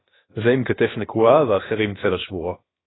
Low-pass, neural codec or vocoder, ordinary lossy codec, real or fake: 7.2 kHz; codec, 16 kHz, about 1 kbps, DyCAST, with the encoder's durations; AAC, 16 kbps; fake